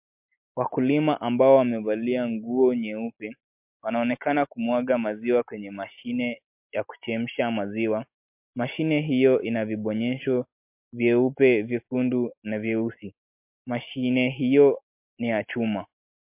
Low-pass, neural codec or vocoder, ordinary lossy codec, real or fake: 3.6 kHz; none; MP3, 32 kbps; real